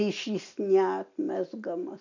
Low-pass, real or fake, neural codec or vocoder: 7.2 kHz; real; none